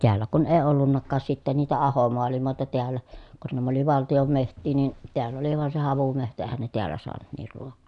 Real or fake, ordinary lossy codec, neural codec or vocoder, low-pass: real; none; none; 10.8 kHz